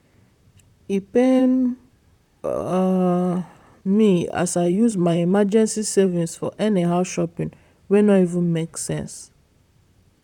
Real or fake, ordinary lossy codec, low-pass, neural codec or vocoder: fake; none; 19.8 kHz; vocoder, 44.1 kHz, 128 mel bands, Pupu-Vocoder